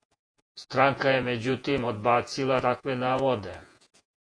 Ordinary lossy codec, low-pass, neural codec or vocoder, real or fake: AAC, 48 kbps; 9.9 kHz; vocoder, 48 kHz, 128 mel bands, Vocos; fake